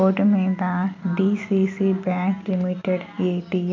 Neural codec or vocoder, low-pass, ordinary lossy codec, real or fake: none; 7.2 kHz; MP3, 64 kbps; real